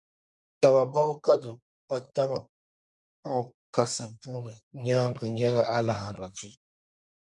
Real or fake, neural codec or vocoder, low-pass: fake; codec, 24 kHz, 1 kbps, SNAC; 10.8 kHz